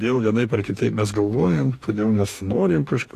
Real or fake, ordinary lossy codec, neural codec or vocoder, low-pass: fake; AAC, 64 kbps; codec, 44.1 kHz, 2.6 kbps, DAC; 14.4 kHz